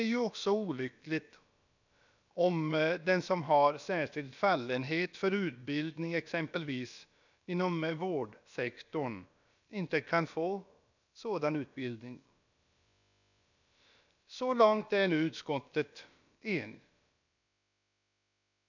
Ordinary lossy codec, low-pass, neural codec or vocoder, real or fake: none; 7.2 kHz; codec, 16 kHz, about 1 kbps, DyCAST, with the encoder's durations; fake